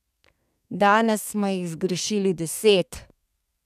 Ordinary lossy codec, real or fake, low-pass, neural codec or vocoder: none; fake; 14.4 kHz; codec, 32 kHz, 1.9 kbps, SNAC